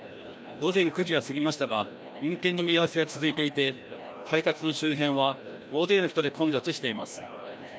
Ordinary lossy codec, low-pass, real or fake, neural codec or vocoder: none; none; fake; codec, 16 kHz, 1 kbps, FreqCodec, larger model